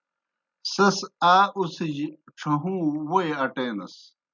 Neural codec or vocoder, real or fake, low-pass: none; real; 7.2 kHz